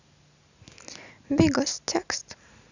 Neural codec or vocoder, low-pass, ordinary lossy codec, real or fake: none; 7.2 kHz; none; real